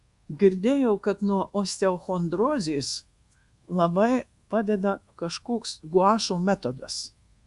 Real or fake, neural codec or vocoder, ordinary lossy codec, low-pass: fake; codec, 24 kHz, 1.2 kbps, DualCodec; Opus, 64 kbps; 10.8 kHz